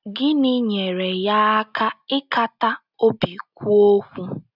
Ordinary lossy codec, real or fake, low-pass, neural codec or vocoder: none; real; 5.4 kHz; none